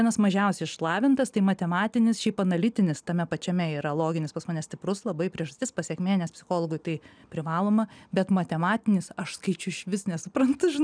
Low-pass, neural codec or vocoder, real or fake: 9.9 kHz; none; real